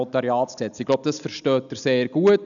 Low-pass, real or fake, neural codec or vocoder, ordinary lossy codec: 7.2 kHz; real; none; none